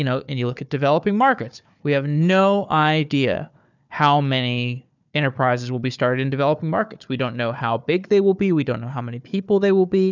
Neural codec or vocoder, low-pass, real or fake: codec, 16 kHz, 4 kbps, FunCodec, trained on Chinese and English, 50 frames a second; 7.2 kHz; fake